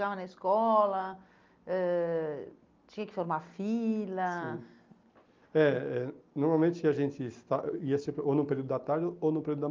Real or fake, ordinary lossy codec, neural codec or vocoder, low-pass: real; Opus, 32 kbps; none; 7.2 kHz